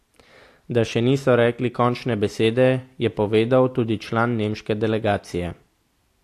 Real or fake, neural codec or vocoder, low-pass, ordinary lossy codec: real; none; 14.4 kHz; AAC, 64 kbps